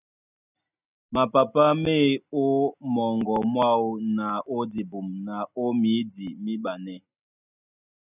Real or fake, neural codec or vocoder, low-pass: real; none; 3.6 kHz